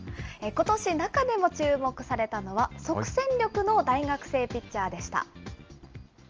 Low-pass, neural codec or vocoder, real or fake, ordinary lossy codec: 7.2 kHz; none; real; Opus, 24 kbps